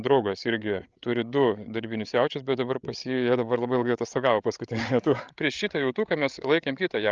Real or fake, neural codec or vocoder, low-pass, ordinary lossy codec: fake; codec, 16 kHz, 8 kbps, FreqCodec, larger model; 7.2 kHz; Opus, 24 kbps